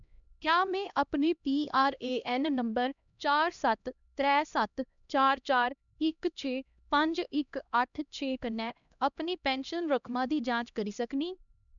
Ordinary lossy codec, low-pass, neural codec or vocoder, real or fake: none; 7.2 kHz; codec, 16 kHz, 1 kbps, X-Codec, HuBERT features, trained on LibriSpeech; fake